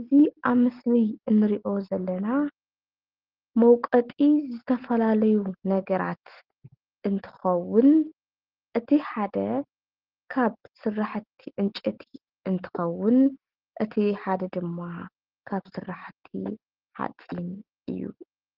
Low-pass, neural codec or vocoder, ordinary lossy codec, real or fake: 5.4 kHz; none; Opus, 16 kbps; real